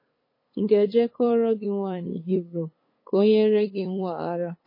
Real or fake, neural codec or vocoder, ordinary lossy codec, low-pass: fake; codec, 16 kHz, 8 kbps, FunCodec, trained on LibriTTS, 25 frames a second; MP3, 24 kbps; 5.4 kHz